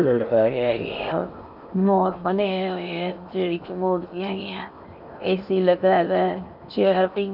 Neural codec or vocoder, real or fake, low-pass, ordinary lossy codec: codec, 16 kHz in and 24 kHz out, 0.8 kbps, FocalCodec, streaming, 65536 codes; fake; 5.4 kHz; none